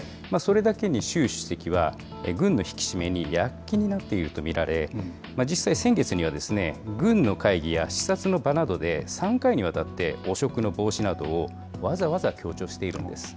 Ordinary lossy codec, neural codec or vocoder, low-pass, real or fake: none; none; none; real